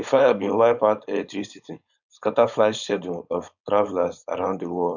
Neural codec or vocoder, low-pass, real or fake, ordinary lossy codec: codec, 16 kHz, 4.8 kbps, FACodec; 7.2 kHz; fake; none